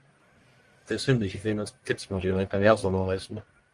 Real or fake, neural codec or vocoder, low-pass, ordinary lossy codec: fake; codec, 44.1 kHz, 1.7 kbps, Pupu-Codec; 10.8 kHz; Opus, 32 kbps